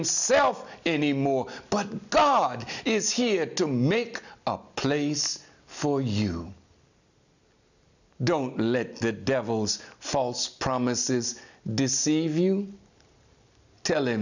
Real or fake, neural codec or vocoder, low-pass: real; none; 7.2 kHz